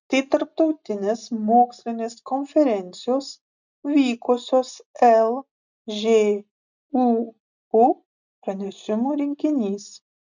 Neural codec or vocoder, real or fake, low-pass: none; real; 7.2 kHz